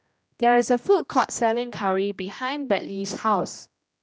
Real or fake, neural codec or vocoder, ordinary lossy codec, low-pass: fake; codec, 16 kHz, 1 kbps, X-Codec, HuBERT features, trained on general audio; none; none